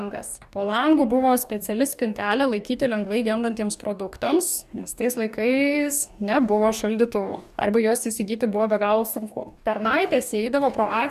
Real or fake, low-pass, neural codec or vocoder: fake; 14.4 kHz; codec, 44.1 kHz, 2.6 kbps, DAC